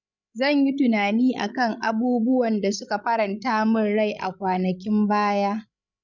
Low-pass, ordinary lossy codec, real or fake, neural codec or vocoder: 7.2 kHz; none; fake; codec, 16 kHz, 16 kbps, FreqCodec, larger model